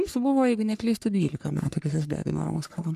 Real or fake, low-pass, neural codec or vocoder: fake; 14.4 kHz; codec, 44.1 kHz, 3.4 kbps, Pupu-Codec